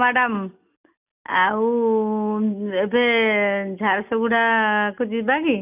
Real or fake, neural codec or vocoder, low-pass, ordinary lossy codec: real; none; 3.6 kHz; none